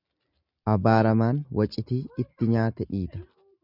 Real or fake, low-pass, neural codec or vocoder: real; 5.4 kHz; none